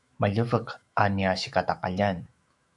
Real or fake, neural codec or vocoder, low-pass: fake; autoencoder, 48 kHz, 128 numbers a frame, DAC-VAE, trained on Japanese speech; 10.8 kHz